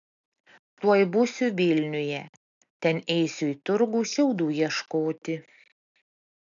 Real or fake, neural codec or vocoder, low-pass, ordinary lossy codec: real; none; 7.2 kHz; MP3, 96 kbps